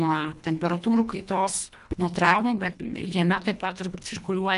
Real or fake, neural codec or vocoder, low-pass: fake; codec, 24 kHz, 1.5 kbps, HILCodec; 10.8 kHz